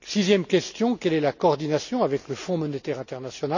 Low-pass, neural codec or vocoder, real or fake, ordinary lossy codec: 7.2 kHz; none; real; none